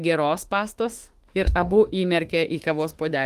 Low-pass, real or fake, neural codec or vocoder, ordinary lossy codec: 14.4 kHz; fake; autoencoder, 48 kHz, 32 numbers a frame, DAC-VAE, trained on Japanese speech; Opus, 24 kbps